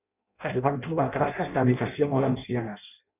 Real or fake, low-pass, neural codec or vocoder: fake; 3.6 kHz; codec, 16 kHz in and 24 kHz out, 0.6 kbps, FireRedTTS-2 codec